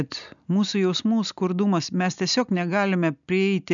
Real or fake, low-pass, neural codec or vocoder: real; 7.2 kHz; none